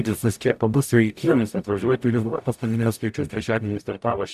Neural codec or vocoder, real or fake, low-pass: codec, 44.1 kHz, 0.9 kbps, DAC; fake; 14.4 kHz